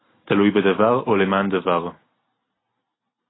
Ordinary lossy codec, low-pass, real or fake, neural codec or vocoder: AAC, 16 kbps; 7.2 kHz; real; none